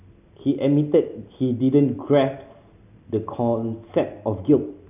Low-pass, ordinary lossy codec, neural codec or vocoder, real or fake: 3.6 kHz; none; none; real